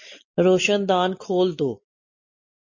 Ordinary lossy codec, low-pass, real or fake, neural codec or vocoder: MP3, 32 kbps; 7.2 kHz; real; none